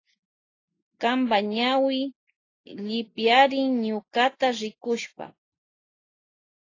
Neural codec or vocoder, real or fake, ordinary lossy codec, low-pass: none; real; AAC, 32 kbps; 7.2 kHz